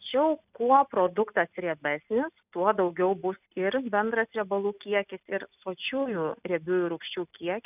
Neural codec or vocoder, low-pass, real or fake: none; 3.6 kHz; real